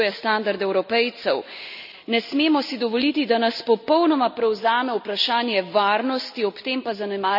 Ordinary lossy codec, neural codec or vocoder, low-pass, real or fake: none; none; 5.4 kHz; real